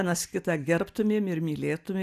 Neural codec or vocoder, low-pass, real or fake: none; 14.4 kHz; real